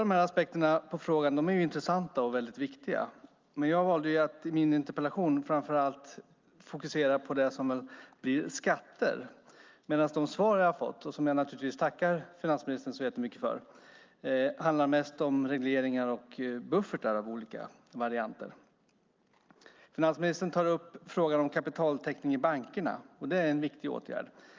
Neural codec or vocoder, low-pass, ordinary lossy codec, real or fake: none; 7.2 kHz; Opus, 24 kbps; real